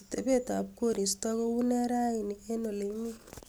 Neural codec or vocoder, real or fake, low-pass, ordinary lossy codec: none; real; none; none